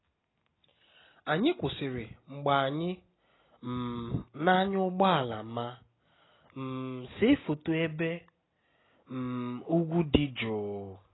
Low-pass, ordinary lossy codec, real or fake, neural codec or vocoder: 7.2 kHz; AAC, 16 kbps; real; none